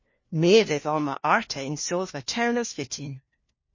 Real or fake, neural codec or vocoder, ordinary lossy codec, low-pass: fake; codec, 16 kHz, 1 kbps, FunCodec, trained on LibriTTS, 50 frames a second; MP3, 32 kbps; 7.2 kHz